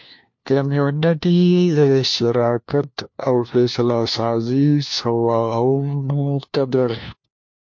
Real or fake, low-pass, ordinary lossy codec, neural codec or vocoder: fake; 7.2 kHz; MP3, 48 kbps; codec, 16 kHz, 1 kbps, FunCodec, trained on LibriTTS, 50 frames a second